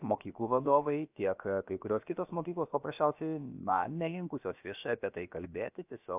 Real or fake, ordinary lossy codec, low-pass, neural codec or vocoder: fake; AAC, 32 kbps; 3.6 kHz; codec, 16 kHz, about 1 kbps, DyCAST, with the encoder's durations